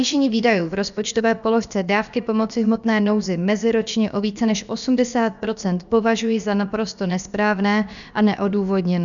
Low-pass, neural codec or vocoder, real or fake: 7.2 kHz; codec, 16 kHz, about 1 kbps, DyCAST, with the encoder's durations; fake